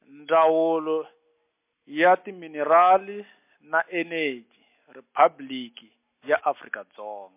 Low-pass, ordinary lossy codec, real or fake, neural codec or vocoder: 3.6 kHz; MP3, 24 kbps; real; none